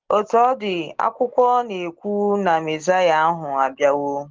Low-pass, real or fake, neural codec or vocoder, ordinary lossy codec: 7.2 kHz; real; none; Opus, 16 kbps